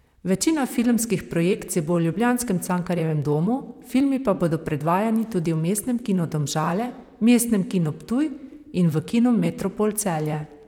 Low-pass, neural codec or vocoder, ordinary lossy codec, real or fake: 19.8 kHz; vocoder, 44.1 kHz, 128 mel bands, Pupu-Vocoder; none; fake